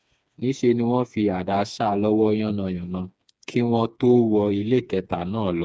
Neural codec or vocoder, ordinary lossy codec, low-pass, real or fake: codec, 16 kHz, 4 kbps, FreqCodec, smaller model; none; none; fake